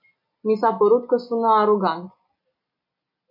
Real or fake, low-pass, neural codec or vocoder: real; 5.4 kHz; none